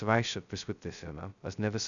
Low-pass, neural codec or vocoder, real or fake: 7.2 kHz; codec, 16 kHz, 0.2 kbps, FocalCodec; fake